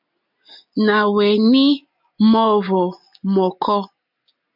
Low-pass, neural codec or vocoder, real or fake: 5.4 kHz; none; real